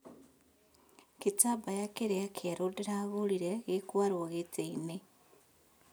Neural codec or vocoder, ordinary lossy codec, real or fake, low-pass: none; none; real; none